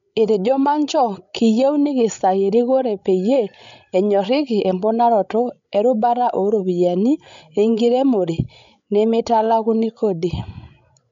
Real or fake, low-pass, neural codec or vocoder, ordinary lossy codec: fake; 7.2 kHz; codec, 16 kHz, 16 kbps, FreqCodec, larger model; MP3, 64 kbps